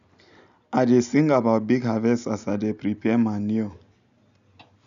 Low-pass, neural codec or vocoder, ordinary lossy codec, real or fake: 7.2 kHz; none; none; real